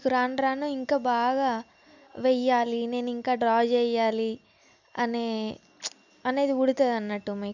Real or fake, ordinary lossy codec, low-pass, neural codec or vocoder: real; none; 7.2 kHz; none